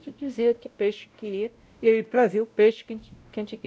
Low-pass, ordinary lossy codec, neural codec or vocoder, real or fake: none; none; codec, 16 kHz, 0.5 kbps, X-Codec, WavLM features, trained on Multilingual LibriSpeech; fake